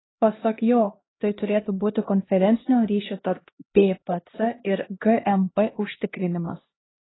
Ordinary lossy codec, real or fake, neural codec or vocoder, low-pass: AAC, 16 kbps; fake; codec, 16 kHz, 1 kbps, X-Codec, HuBERT features, trained on LibriSpeech; 7.2 kHz